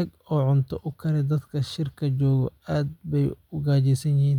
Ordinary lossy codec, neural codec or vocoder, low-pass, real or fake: none; none; 19.8 kHz; real